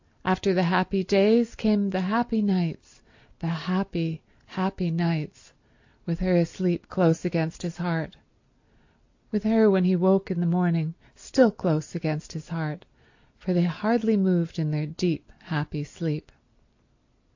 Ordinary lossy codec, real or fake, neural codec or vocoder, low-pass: AAC, 48 kbps; real; none; 7.2 kHz